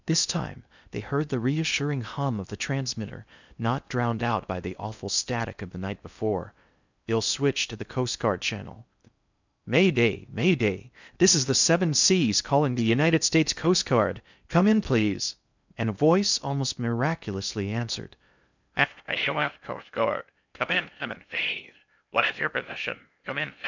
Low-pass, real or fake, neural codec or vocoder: 7.2 kHz; fake; codec, 16 kHz in and 24 kHz out, 0.6 kbps, FocalCodec, streaming, 2048 codes